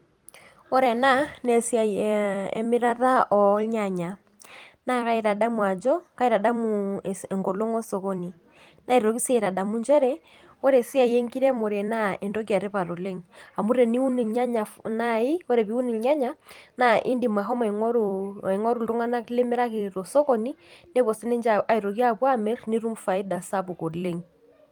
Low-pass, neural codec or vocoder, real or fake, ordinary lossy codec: 19.8 kHz; vocoder, 44.1 kHz, 128 mel bands every 256 samples, BigVGAN v2; fake; Opus, 32 kbps